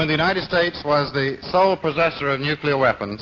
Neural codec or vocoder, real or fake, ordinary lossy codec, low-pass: none; real; AAC, 48 kbps; 7.2 kHz